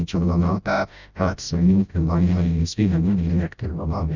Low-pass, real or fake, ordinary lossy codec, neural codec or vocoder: 7.2 kHz; fake; none; codec, 16 kHz, 0.5 kbps, FreqCodec, smaller model